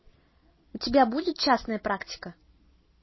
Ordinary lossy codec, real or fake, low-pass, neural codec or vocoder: MP3, 24 kbps; real; 7.2 kHz; none